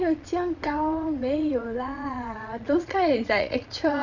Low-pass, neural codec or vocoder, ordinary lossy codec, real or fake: 7.2 kHz; vocoder, 22.05 kHz, 80 mel bands, Vocos; none; fake